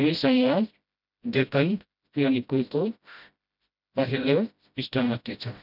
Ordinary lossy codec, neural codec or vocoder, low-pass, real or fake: none; codec, 16 kHz, 0.5 kbps, FreqCodec, smaller model; 5.4 kHz; fake